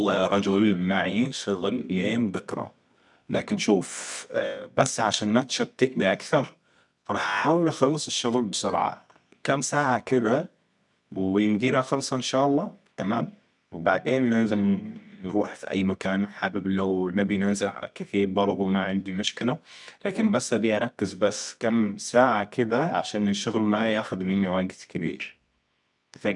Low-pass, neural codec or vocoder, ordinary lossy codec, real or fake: 10.8 kHz; codec, 24 kHz, 0.9 kbps, WavTokenizer, medium music audio release; none; fake